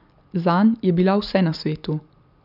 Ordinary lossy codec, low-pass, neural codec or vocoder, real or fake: none; 5.4 kHz; none; real